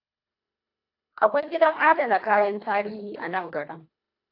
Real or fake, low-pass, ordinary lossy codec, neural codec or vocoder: fake; 5.4 kHz; AAC, 32 kbps; codec, 24 kHz, 1.5 kbps, HILCodec